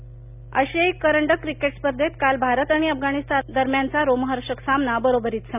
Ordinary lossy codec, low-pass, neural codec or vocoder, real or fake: none; 3.6 kHz; none; real